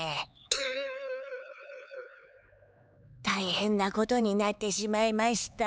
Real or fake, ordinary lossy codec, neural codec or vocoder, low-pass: fake; none; codec, 16 kHz, 4 kbps, X-Codec, HuBERT features, trained on LibriSpeech; none